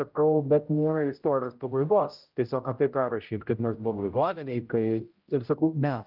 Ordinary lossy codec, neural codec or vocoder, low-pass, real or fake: Opus, 32 kbps; codec, 16 kHz, 0.5 kbps, X-Codec, HuBERT features, trained on general audio; 5.4 kHz; fake